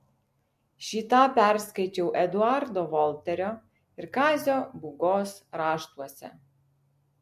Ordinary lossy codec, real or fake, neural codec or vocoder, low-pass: MP3, 64 kbps; real; none; 14.4 kHz